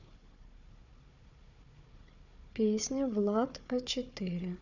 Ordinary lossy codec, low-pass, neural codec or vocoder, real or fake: none; 7.2 kHz; codec, 16 kHz, 4 kbps, FunCodec, trained on Chinese and English, 50 frames a second; fake